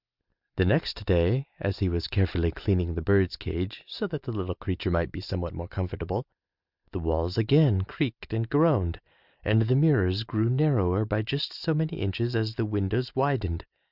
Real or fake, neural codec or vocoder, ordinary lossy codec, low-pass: real; none; Opus, 64 kbps; 5.4 kHz